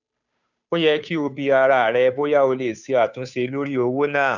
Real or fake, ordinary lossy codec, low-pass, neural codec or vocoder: fake; none; 7.2 kHz; codec, 16 kHz, 2 kbps, FunCodec, trained on Chinese and English, 25 frames a second